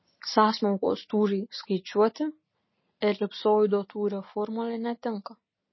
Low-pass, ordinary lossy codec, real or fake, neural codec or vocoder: 7.2 kHz; MP3, 24 kbps; real; none